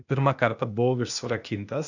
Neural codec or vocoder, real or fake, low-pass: codec, 16 kHz, 0.8 kbps, ZipCodec; fake; 7.2 kHz